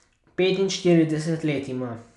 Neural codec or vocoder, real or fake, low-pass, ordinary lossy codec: none; real; 10.8 kHz; none